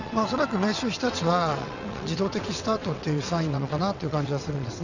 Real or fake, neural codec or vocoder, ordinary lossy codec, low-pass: fake; vocoder, 22.05 kHz, 80 mel bands, WaveNeXt; none; 7.2 kHz